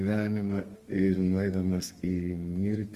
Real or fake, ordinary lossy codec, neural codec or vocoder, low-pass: fake; Opus, 24 kbps; codec, 32 kHz, 1.9 kbps, SNAC; 14.4 kHz